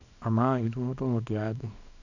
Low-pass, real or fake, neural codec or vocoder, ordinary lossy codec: 7.2 kHz; fake; codec, 24 kHz, 0.9 kbps, WavTokenizer, small release; none